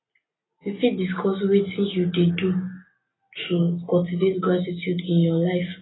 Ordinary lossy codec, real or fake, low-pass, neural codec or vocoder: AAC, 16 kbps; real; 7.2 kHz; none